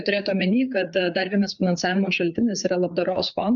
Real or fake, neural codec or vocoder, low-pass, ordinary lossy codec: fake; codec, 16 kHz, 4 kbps, FreqCodec, larger model; 7.2 kHz; MP3, 96 kbps